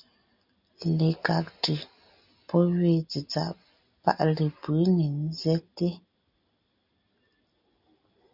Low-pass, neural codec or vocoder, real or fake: 5.4 kHz; none; real